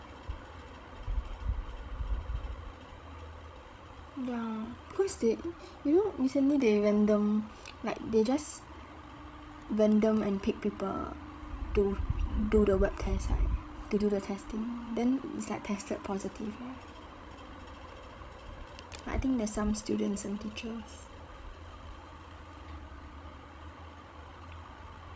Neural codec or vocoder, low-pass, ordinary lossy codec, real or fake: codec, 16 kHz, 16 kbps, FreqCodec, larger model; none; none; fake